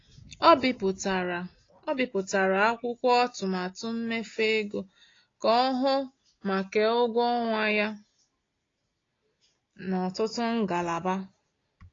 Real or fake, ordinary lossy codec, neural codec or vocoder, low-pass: real; AAC, 32 kbps; none; 7.2 kHz